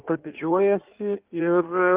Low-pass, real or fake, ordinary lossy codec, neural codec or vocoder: 3.6 kHz; fake; Opus, 24 kbps; codec, 16 kHz in and 24 kHz out, 1.1 kbps, FireRedTTS-2 codec